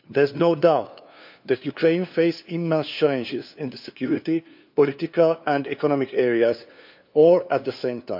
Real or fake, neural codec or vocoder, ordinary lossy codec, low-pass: fake; codec, 16 kHz, 2 kbps, FunCodec, trained on LibriTTS, 25 frames a second; MP3, 48 kbps; 5.4 kHz